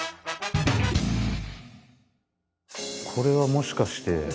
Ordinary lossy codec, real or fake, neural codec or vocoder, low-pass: none; real; none; none